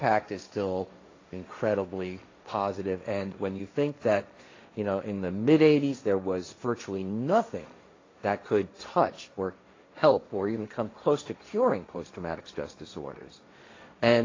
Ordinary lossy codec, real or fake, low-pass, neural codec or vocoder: AAC, 32 kbps; fake; 7.2 kHz; codec, 16 kHz, 1.1 kbps, Voila-Tokenizer